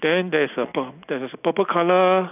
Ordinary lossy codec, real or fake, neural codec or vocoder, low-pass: none; real; none; 3.6 kHz